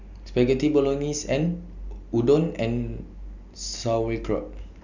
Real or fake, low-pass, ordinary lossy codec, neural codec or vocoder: real; 7.2 kHz; none; none